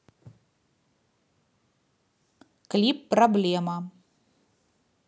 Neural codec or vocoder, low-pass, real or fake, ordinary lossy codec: none; none; real; none